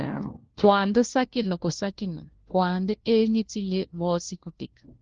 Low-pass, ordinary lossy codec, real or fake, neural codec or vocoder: 7.2 kHz; Opus, 16 kbps; fake; codec, 16 kHz, 1 kbps, FunCodec, trained on LibriTTS, 50 frames a second